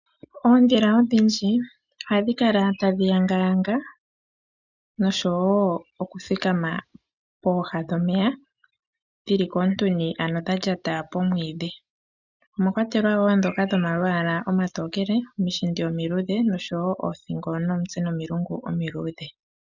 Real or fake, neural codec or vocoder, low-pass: real; none; 7.2 kHz